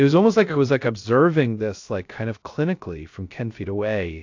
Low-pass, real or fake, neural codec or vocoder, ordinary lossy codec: 7.2 kHz; fake; codec, 16 kHz, 0.3 kbps, FocalCodec; AAC, 48 kbps